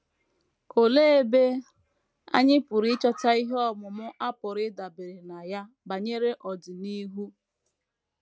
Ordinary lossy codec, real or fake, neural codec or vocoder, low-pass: none; real; none; none